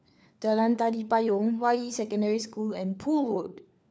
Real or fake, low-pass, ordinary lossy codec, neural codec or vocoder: fake; none; none; codec, 16 kHz, 4 kbps, FunCodec, trained on LibriTTS, 50 frames a second